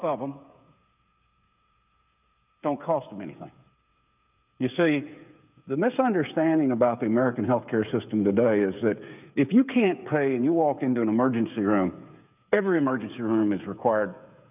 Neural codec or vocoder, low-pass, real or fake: codec, 16 kHz, 8 kbps, FreqCodec, smaller model; 3.6 kHz; fake